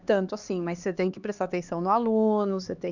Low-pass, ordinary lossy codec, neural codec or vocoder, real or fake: 7.2 kHz; none; codec, 16 kHz, 2 kbps, X-Codec, HuBERT features, trained on LibriSpeech; fake